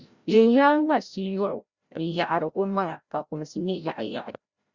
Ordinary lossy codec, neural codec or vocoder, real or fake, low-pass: Opus, 64 kbps; codec, 16 kHz, 0.5 kbps, FreqCodec, larger model; fake; 7.2 kHz